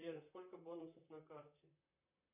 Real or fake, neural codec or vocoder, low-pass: fake; vocoder, 44.1 kHz, 128 mel bands, Pupu-Vocoder; 3.6 kHz